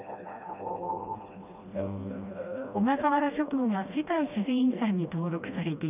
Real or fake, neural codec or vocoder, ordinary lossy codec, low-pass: fake; codec, 16 kHz, 1 kbps, FreqCodec, smaller model; none; 3.6 kHz